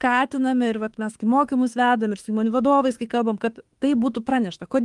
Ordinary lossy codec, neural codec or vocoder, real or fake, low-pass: Opus, 24 kbps; autoencoder, 48 kHz, 32 numbers a frame, DAC-VAE, trained on Japanese speech; fake; 10.8 kHz